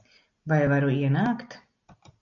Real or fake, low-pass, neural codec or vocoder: real; 7.2 kHz; none